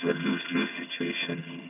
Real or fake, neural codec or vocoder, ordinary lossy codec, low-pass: fake; vocoder, 22.05 kHz, 80 mel bands, HiFi-GAN; none; 3.6 kHz